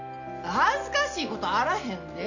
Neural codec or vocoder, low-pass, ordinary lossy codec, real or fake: none; 7.2 kHz; none; real